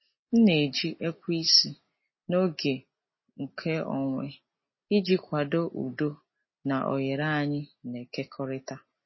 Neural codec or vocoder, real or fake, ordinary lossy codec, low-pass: none; real; MP3, 24 kbps; 7.2 kHz